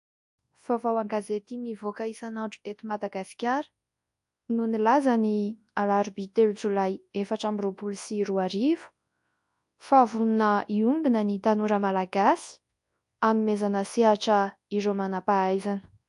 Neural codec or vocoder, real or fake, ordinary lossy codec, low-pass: codec, 24 kHz, 0.9 kbps, WavTokenizer, large speech release; fake; AAC, 64 kbps; 10.8 kHz